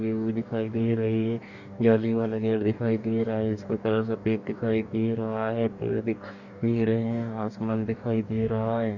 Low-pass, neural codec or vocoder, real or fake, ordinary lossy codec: 7.2 kHz; codec, 44.1 kHz, 2.6 kbps, DAC; fake; none